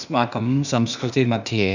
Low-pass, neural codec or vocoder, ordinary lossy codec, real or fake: 7.2 kHz; codec, 16 kHz, 0.8 kbps, ZipCodec; none; fake